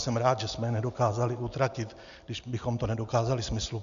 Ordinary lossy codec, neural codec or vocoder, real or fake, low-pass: MP3, 64 kbps; none; real; 7.2 kHz